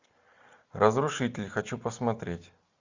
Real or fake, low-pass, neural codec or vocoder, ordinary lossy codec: real; 7.2 kHz; none; Opus, 64 kbps